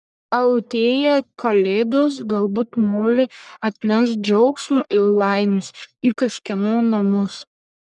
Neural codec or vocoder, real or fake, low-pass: codec, 44.1 kHz, 1.7 kbps, Pupu-Codec; fake; 10.8 kHz